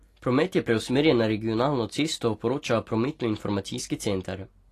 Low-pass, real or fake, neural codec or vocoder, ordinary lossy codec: 14.4 kHz; real; none; AAC, 48 kbps